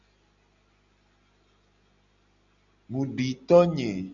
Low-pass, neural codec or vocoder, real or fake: 7.2 kHz; none; real